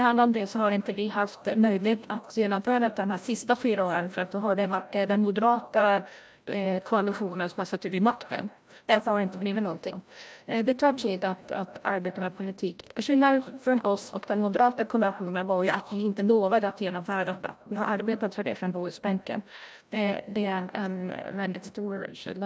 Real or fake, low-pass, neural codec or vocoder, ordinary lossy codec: fake; none; codec, 16 kHz, 0.5 kbps, FreqCodec, larger model; none